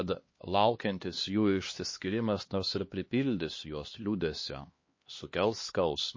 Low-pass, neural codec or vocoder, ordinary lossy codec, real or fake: 7.2 kHz; codec, 16 kHz, 2 kbps, X-Codec, HuBERT features, trained on LibriSpeech; MP3, 32 kbps; fake